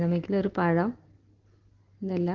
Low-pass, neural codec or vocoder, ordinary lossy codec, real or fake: 7.2 kHz; none; Opus, 16 kbps; real